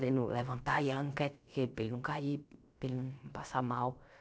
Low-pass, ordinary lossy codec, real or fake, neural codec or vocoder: none; none; fake; codec, 16 kHz, about 1 kbps, DyCAST, with the encoder's durations